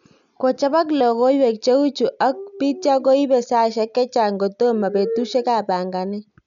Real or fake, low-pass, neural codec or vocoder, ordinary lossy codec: real; 7.2 kHz; none; none